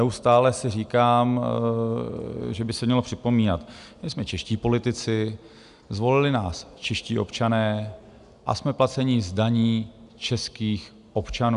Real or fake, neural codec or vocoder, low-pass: real; none; 10.8 kHz